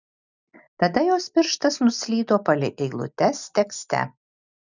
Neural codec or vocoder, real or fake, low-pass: none; real; 7.2 kHz